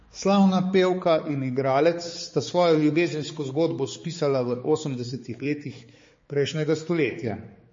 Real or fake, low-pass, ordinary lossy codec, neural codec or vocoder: fake; 7.2 kHz; MP3, 32 kbps; codec, 16 kHz, 4 kbps, X-Codec, HuBERT features, trained on balanced general audio